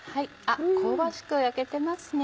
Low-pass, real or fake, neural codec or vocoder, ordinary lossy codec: none; real; none; none